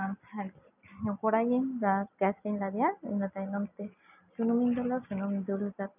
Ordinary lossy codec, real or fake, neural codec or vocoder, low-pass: none; real; none; 3.6 kHz